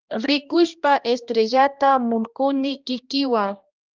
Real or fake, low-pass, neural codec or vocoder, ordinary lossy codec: fake; 7.2 kHz; codec, 16 kHz, 1 kbps, X-Codec, HuBERT features, trained on balanced general audio; Opus, 24 kbps